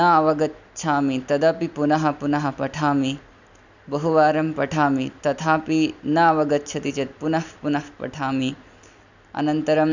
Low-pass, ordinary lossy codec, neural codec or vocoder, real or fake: 7.2 kHz; none; none; real